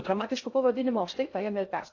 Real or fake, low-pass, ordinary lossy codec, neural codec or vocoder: fake; 7.2 kHz; AAC, 48 kbps; codec, 16 kHz in and 24 kHz out, 0.6 kbps, FocalCodec, streaming, 2048 codes